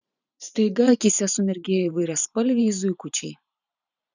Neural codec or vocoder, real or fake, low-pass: vocoder, 44.1 kHz, 128 mel bands every 512 samples, BigVGAN v2; fake; 7.2 kHz